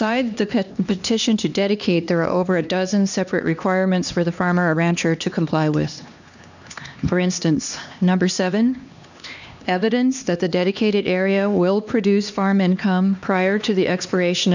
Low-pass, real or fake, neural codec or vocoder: 7.2 kHz; fake; codec, 16 kHz, 2 kbps, X-Codec, HuBERT features, trained on LibriSpeech